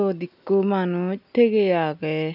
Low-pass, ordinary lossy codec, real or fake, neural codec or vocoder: 5.4 kHz; none; real; none